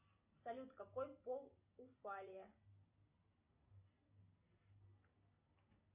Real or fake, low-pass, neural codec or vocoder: real; 3.6 kHz; none